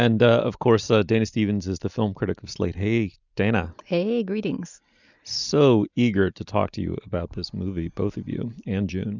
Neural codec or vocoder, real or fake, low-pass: none; real; 7.2 kHz